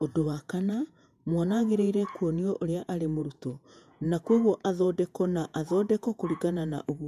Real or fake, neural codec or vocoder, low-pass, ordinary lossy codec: fake; vocoder, 48 kHz, 128 mel bands, Vocos; 14.4 kHz; MP3, 96 kbps